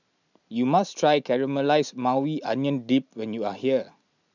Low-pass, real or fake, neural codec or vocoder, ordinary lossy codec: 7.2 kHz; real; none; none